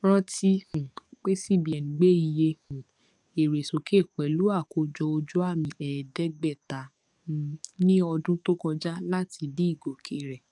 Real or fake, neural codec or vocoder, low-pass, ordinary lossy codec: fake; codec, 44.1 kHz, 7.8 kbps, DAC; 10.8 kHz; none